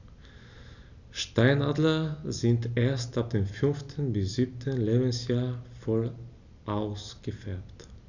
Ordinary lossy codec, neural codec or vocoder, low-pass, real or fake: none; none; 7.2 kHz; real